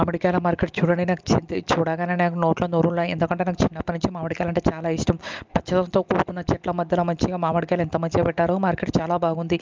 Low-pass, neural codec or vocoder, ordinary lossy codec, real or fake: 7.2 kHz; none; Opus, 32 kbps; real